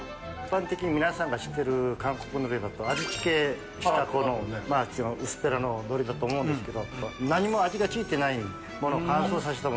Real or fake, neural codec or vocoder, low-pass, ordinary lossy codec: real; none; none; none